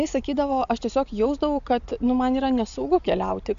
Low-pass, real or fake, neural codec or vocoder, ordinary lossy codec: 7.2 kHz; real; none; AAC, 96 kbps